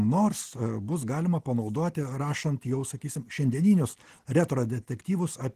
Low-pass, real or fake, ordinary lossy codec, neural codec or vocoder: 14.4 kHz; real; Opus, 16 kbps; none